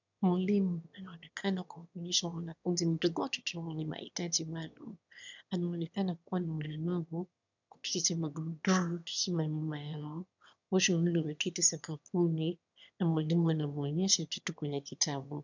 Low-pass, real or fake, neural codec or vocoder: 7.2 kHz; fake; autoencoder, 22.05 kHz, a latent of 192 numbers a frame, VITS, trained on one speaker